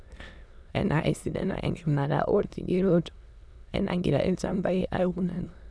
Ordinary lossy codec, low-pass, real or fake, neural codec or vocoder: none; none; fake; autoencoder, 22.05 kHz, a latent of 192 numbers a frame, VITS, trained on many speakers